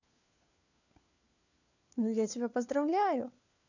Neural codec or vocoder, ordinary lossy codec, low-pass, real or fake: codec, 16 kHz, 4 kbps, FunCodec, trained on LibriTTS, 50 frames a second; none; 7.2 kHz; fake